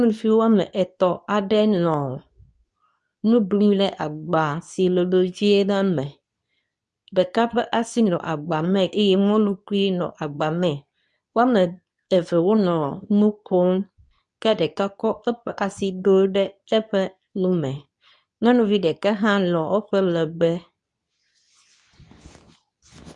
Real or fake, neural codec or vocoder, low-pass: fake; codec, 24 kHz, 0.9 kbps, WavTokenizer, medium speech release version 2; 10.8 kHz